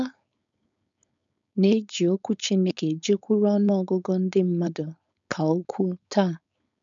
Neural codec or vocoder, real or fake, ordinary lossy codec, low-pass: codec, 16 kHz, 4.8 kbps, FACodec; fake; none; 7.2 kHz